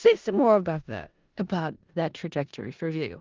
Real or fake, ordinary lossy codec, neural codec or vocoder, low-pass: fake; Opus, 32 kbps; codec, 16 kHz in and 24 kHz out, 0.4 kbps, LongCat-Audio-Codec, four codebook decoder; 7.2 kHz